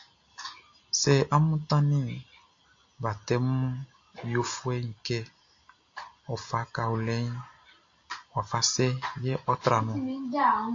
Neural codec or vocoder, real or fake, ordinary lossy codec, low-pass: none; real; AAC, 48 kbps; 7.2 kHz